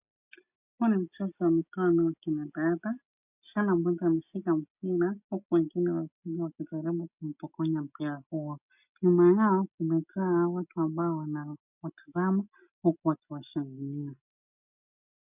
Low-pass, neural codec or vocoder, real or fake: 3.6 kHz; none; real